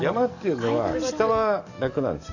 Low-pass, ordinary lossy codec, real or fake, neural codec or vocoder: 7.2 kHz; none; fake; codec, 44.1 kHz, 7.8 kbps, DAC